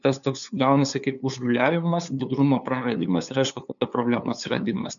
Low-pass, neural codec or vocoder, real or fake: 7.2 kHz; codec, 16 kHz, 2 kbps, FunCodec, trained on LibriTTS, 25 frames a second; fake